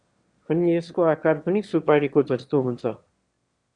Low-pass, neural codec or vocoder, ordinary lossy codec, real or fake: 9.9 kHz; autoencoder, 22.05 kHz, a latent of 192 numbers a frame, VITS, trained on one speaker; Opus, 64 kbps; fake